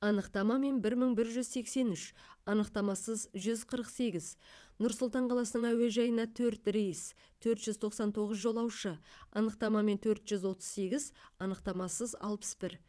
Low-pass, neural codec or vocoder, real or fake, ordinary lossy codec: none; vocoder, 22.05 kHz, 80 mel bands, WaveNeXt; fake; none